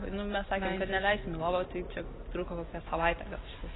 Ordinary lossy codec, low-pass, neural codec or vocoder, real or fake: AAC, 16 kbps; 7.2 kHz; none; real